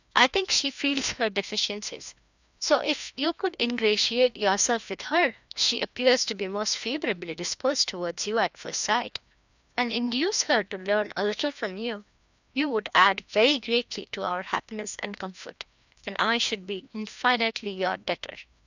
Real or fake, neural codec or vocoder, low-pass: fake; codec, 16 kHz, 1 kbps, FreqCodec, larger model; 7.2 kHz